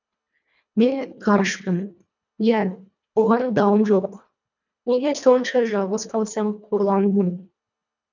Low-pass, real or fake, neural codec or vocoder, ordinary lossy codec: 7.2 kHz; fake; codec, 24 kHz, 1.5 kbps, HILCodec; none